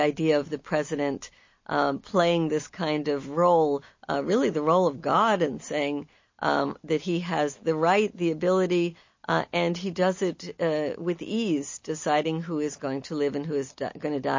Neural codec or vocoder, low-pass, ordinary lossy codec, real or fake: none; 7.2 kHz; MP3, 32 kbps; real